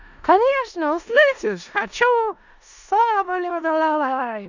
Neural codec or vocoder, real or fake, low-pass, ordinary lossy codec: codec, 16 kHz in and 24 kHz out, 0.4 kbps, LongCat-Audio-Codec, four codebook decoder; fake; 7.2 kHz; none